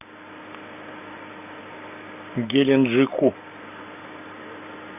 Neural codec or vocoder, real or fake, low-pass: none; real; 3.6 kHz